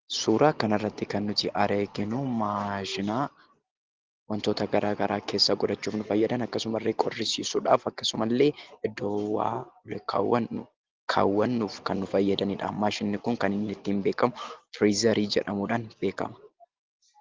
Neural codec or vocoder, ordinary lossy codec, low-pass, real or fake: none; Opus, 16 kbps; 7.2 kHz; real